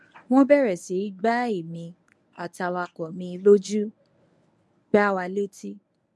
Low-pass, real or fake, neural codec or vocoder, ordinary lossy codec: none; fake; codec, 24 kHz, 0.9 kbps, WavTokenizer, medium speech release version 1; none